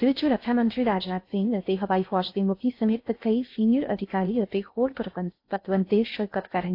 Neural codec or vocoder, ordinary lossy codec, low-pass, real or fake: codec, 16 kHz in and 24 kHz out, 0.6 kbps, FocalCodec, streaming, 2048 codes; AAC, 32 kbps; 5.4 kHz; fake